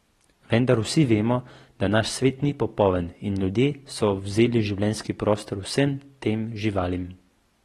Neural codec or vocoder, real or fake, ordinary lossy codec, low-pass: none; real; AAC, 32 kbps; 19.8 kHz